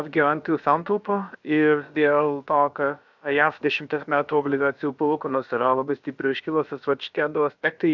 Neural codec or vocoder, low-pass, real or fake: codec, 16 kHz, about 1 kbps, DyCAST, with the encoder's durations; 7.2 kHz; fake